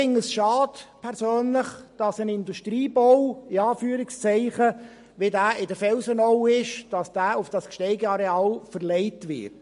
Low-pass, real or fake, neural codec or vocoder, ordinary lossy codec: 10.8 kHz; real; none; MP3, 48 kbps